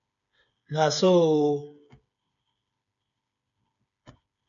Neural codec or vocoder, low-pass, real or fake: codec, 16 kHz, 8 kbps, FreqCodec, smaller model; 7.2 kHz; fake